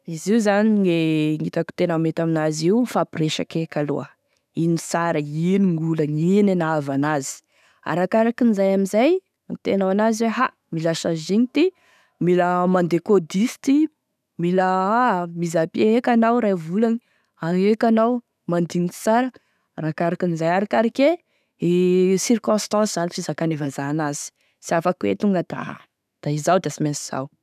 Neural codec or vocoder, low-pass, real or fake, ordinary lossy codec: autoencoder, 48 kHz, 128 numbers a frame, DAC-VAE, trained on Japanese speech; 14.4 kHz; fake; none